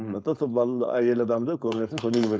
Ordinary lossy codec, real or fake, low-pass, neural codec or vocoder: none; fake; none; codec, 16 kHz, 4.8 kbps, FACodec